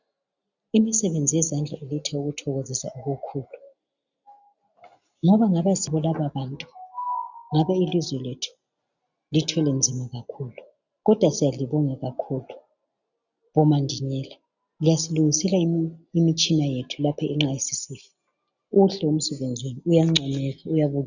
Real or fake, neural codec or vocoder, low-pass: real; none; 7.2 kHz